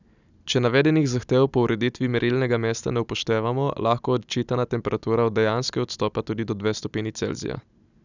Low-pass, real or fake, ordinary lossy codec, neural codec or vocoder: 7.2 kHz; real; none; none